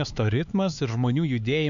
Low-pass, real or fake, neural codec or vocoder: 7.2 kHz; fake; codec, 16 kHz, 4 kbps, X-Codec, HuBERT features, trained on LibriSpeech